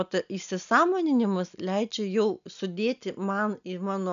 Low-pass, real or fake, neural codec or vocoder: 7.2 kHz; real; none